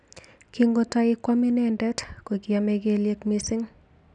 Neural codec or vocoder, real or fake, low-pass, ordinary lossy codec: none; real; 9.9 kHz; none